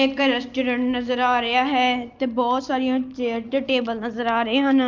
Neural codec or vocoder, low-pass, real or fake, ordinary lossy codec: none; 7.2 kHz; real; Opus, 24 kbps